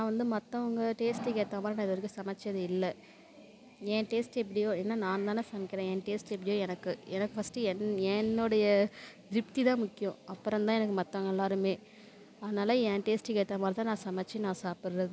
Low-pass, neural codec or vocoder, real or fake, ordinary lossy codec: none; none; real; none